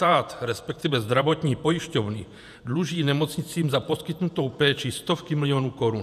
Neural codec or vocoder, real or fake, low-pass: vocoder, 48 kHz, 128 mel bands, Vocos; fake; 14.4 kHz